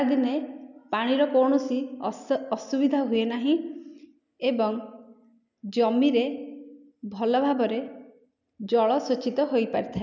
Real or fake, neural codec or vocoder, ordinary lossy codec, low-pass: real; none; none; 7.2 kHz